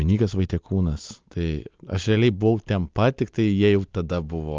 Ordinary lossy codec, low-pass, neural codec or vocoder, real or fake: Opus, 24 kbps; 7.2 kHz; none; real